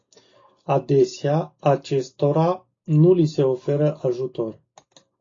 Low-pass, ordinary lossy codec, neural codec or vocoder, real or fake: 7.2 kHz; AAC, 32 kbps; none; real